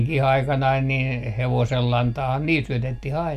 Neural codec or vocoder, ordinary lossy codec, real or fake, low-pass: none; none; real; 14.4 kHz